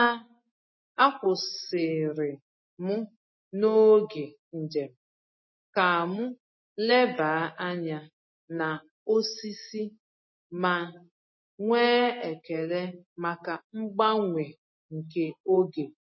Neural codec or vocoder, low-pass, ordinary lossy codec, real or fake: none; 7.2 kHz; MP3, 24 kbps; real